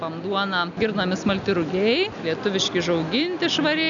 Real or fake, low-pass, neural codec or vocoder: real; 7.2 kHz; none